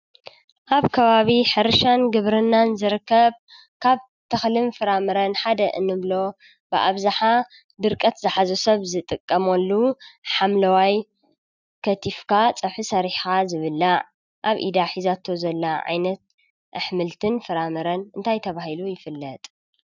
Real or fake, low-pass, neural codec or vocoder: real; 7.2 kHz; none